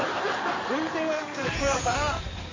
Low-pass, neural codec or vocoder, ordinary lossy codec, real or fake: 7.2 kHz; codec, 16 kHz in and 24 kHz out, 2.2 kbps, FireRedTTS-2 codec; MP3, 48 kbps; fake